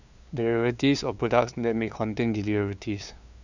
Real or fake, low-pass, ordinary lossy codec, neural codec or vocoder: fake; 7.2 kHz; none; codec, 16 kHz, 2 kbps, FunCodec, trained on LibriTTS, 25 frames a second